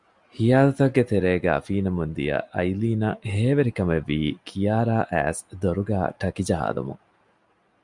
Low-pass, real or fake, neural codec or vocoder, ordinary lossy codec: 10.8 kHz; real; none; MP3, 96 kbps